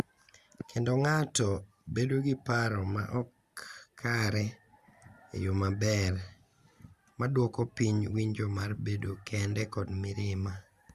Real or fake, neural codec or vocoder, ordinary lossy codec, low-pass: real; none; Opus, 64 kbps; 14.4 kHz